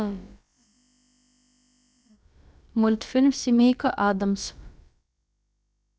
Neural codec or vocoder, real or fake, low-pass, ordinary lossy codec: codec, 16 kHz, about 1 kbps, DyCAST, with the encoder's durations; fake; none; none